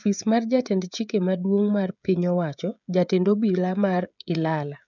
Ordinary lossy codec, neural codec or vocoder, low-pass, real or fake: none; codec, 16 kHz, 16 kbps, FreqCodec, smaller model; 7.2 kHz; fake